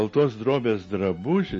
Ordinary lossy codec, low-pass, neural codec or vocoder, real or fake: MP3, 32 kbps; 9.9 kHz; none; real